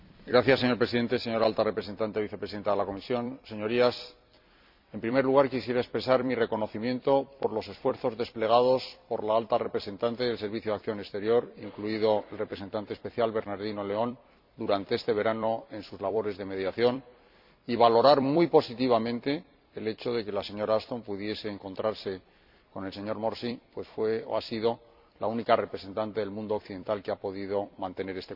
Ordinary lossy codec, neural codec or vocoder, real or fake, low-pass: MP3, 48 kbps; vocoder, 44.1 kHz, 128 mel bands every 256 samples, BigVGAN v2; fake; 5.4 kHz